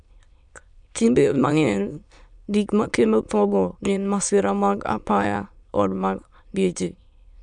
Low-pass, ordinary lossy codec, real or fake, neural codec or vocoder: 9.9 kHz; MP3, 96 kbps; fake; autoencoder, 22.05 kHz, a latent of 192 numbers a frame, VITS, trained on many speakers